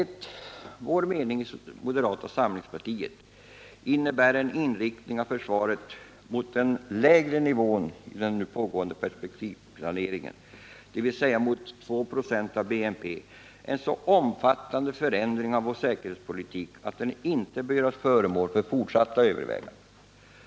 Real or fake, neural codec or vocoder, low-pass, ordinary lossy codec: real; none; none; none